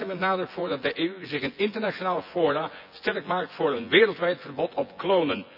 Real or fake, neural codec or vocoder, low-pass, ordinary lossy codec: fake; vocoder, 24 kHz, 100 mel bands, Vocos; 5.4 kHz; none